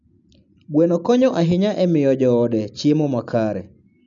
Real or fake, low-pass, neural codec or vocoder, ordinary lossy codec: real; 7.2 kHz; none; none